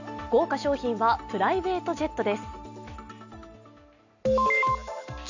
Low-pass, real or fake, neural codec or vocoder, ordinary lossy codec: 7.2 kHz; real; none; none